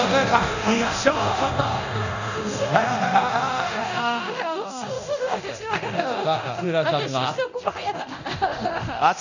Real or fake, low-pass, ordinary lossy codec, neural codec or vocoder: fake; 7.2 kHz; none; codec, 24 kHz, 0.9 kbps, DualCodec